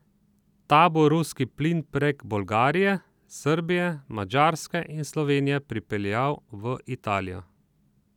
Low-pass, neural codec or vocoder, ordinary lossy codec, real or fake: 19.8 kHz; none; none; real